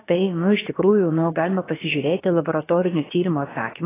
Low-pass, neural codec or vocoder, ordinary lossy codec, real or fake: 3.6 kHz; codec, 16 kHz, about 1 kbps, DyCAST, with the encoder's durations; AAC, 16 kbps; fake